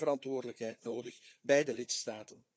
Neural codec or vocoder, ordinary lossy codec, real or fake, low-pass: codec, 16 kHz, 4 kbps, FunCodec, trained on Chinese and English, 50 frames a second; none; fake; none